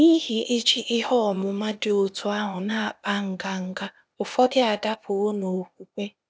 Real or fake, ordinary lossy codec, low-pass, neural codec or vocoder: fake; none; none; codec, 16 kHz, 0.8 kbps, ZipCodec